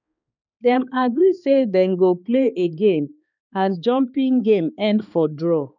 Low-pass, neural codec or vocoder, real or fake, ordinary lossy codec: 7.2 kHz; codec, 16 kHz, 2 kbps, X-Codec, HuBERT features, trained on balanced general audio; fake; none